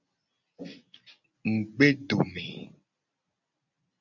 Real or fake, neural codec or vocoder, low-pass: real; none; 7.2 kHz